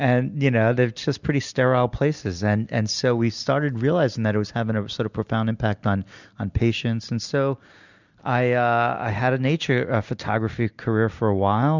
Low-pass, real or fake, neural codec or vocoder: 7.2 kHz; real; none